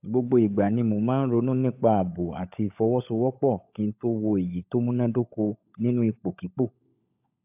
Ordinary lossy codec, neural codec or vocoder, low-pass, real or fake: AAC, 32 kbps; codec, 16 kHz, 16 kbps, FunCodec, trained on LibriTTS, 50 frames a second; 3.6 kHz; fake